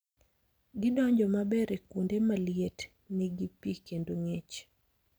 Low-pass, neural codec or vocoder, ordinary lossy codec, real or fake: none; none; none; real